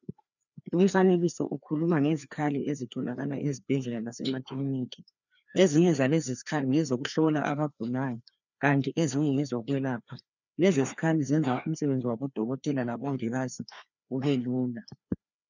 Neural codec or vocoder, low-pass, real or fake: codec, 16 kHz, 2 kbps, FreqCodec, larger model; 7.2 kHz; fake